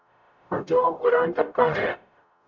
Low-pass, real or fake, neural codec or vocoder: 7.2 kHz; fake; codec, 44.1 kHz, 0.9 kbps, DAC